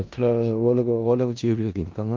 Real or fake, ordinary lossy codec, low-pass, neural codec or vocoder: fake; Opus, 32 kbps; 7.2 kHz; codec, 16 kHz in and 24 kHz out, 0.4 kbps, LongCat-Audio-Codec, four codebook decoder